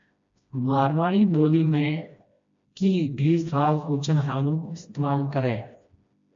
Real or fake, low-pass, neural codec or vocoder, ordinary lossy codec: fake; 7.2 kHz; codec, 16 kHz, 1 kbps, FreqCodec, smaller model; MP3, 48 kbps